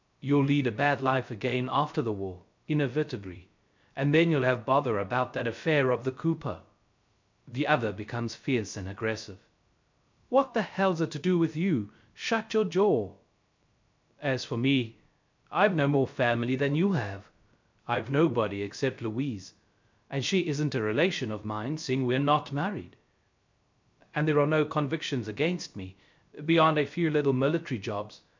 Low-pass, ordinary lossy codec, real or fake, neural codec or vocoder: 7.2 kHz; MP3, 64 kbps; fake; codec, 16 kHz, 0.3 kbps, FocalCodec